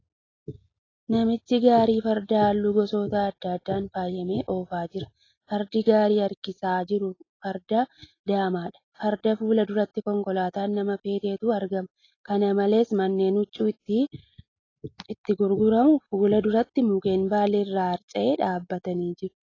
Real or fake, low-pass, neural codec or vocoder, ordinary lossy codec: real; 7.2 kHz; none; AAC, 32 kbps